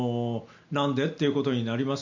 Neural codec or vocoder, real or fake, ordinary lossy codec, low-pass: none; real; none; 7.2 kHz